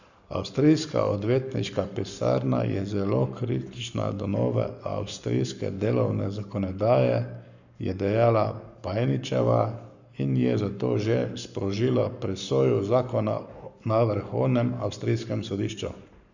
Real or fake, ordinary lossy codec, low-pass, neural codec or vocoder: fake; none; 7.2 kHz; codec, 44.1 kHz, 7.8 kbps, Pupu-Codec